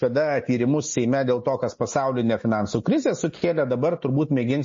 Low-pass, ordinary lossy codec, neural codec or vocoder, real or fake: 7.2 kHz; MP3, 32 kbps; none; real